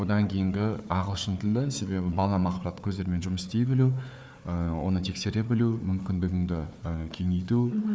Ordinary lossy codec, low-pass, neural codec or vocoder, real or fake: none; none; codec, 16 kHz, 4 kbps, FunCodec, trained on Chinese and English, 50 frames a second; fake